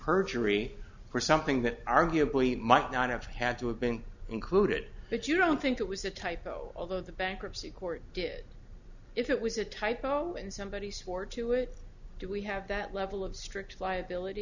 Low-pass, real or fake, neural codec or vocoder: 7.2 kHz; real; none